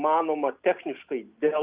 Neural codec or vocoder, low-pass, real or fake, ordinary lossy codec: none; 3.6 kHz; real; Opus, 32 kbps